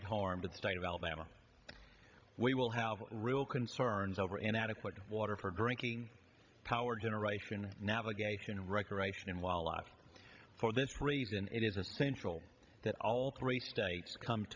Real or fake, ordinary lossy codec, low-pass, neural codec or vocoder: fake; AAC, 48 kbps; 7.2 kHz; codec, 16 kHz, 16 kbps, FreqCodec, larger model